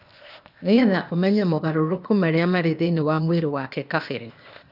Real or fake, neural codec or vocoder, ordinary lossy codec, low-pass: fake; codec, 16 kHz, 0.8 kbps, ZipCodec; none; 5.4 kHz